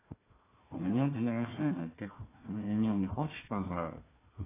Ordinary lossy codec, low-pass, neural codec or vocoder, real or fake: AAC, 16 kbps; 3.6 kHz; codec, 16 kHz, 1 kbps, FunCodec, trained on Chinese and English, 50 frames a second; fake